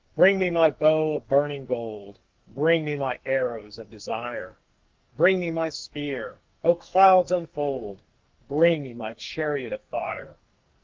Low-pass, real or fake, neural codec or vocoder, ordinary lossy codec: 7.2 kHz; fake; codec, 44.1 kHz, 2.6 kbps, SNAC; Opus, 32 kbps